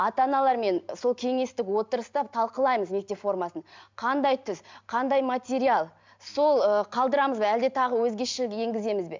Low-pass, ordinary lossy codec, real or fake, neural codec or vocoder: 7.2 kHz; MP3, 64 kbps; real; none